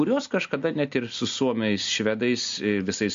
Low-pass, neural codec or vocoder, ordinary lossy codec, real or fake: 7.2 kHz; none; MP3, 48 kbps; real